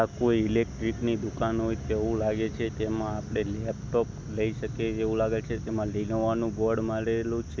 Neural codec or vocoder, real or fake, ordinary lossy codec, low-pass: none; real; none; none